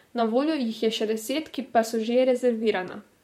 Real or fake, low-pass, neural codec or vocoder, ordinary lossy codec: fake; 19.8 kHz; vocoder, 44.1 kHz, 128 mel bands, Pupu-Vocoder; MP3, 64 kbps